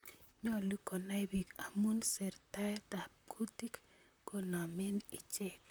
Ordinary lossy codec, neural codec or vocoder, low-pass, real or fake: none; vocoder, 44.1 kHz, 128 mel bands, Pupu-Vocoder; none; fake